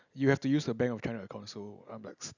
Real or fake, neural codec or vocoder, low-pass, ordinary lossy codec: real; none; 7.2 kHz; none